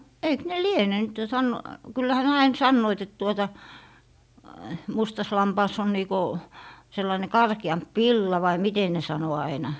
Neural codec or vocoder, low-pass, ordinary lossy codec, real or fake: none; none; none; real